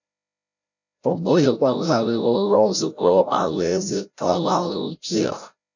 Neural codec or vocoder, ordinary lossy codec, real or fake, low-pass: codec, 16 kHz, 0.5 kbps, FreqCodec, larger model; none; fake; 7.2 kHz